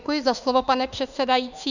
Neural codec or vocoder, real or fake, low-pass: autoencoder, 48 kHz, 32 numbers a frame, DAC-VAE, trained on Japanese speech; fake; 7.2 kHz